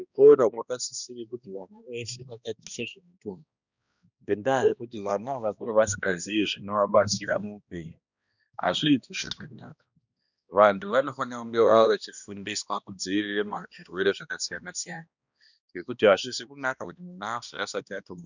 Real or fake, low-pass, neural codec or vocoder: fake; 7.2 kHz; codec, 16 kHz, 1 kbps, X-Codec, HuBERT features, trained on balanced general audio